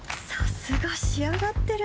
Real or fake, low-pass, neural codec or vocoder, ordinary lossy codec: real; none; none; none